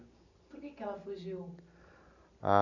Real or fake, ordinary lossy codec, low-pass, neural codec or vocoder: real; none; 7.2 kHz; none